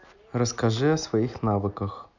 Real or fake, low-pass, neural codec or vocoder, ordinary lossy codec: real; 7.2 kHz; none; none